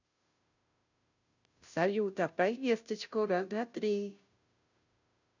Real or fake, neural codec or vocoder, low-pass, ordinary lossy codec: fake; codec, 16 kHz, 0.5 kbps, FunCodec, trained on Chinese and English, 25 frames a second; 7.2 kHz; none